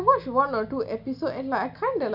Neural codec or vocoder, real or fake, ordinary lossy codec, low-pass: none; real; none; 5.4 kHz